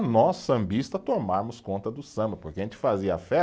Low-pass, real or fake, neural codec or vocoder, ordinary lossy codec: none; real; none; none